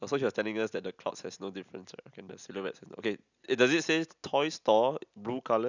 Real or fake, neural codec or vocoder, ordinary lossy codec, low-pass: real; none; none; 7.2 kHz